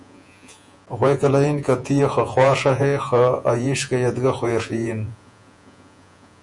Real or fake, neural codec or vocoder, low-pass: fake; vocoder, 48 kHz, 128 mel bands, Vocos; 10.8 kHz